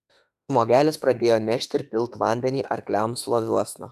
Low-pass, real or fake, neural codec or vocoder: 14.4 kHz; fake; autoencoder, 48 kHz, 32 numbers a frame, DAC-VAE, trained on Japanese speech